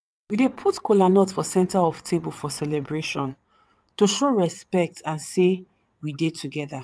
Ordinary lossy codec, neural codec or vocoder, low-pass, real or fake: none; vocoder, 22.05 kHz, 80 mel bands, WaveNeXt; none; fake